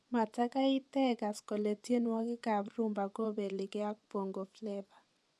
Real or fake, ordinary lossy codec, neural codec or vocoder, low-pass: fake; none; vocoder, 24 kHz, 100 mel bands, Vocos; none